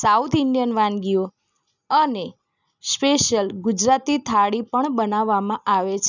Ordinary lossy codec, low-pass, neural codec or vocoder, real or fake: none; 7.2 kHz; none; real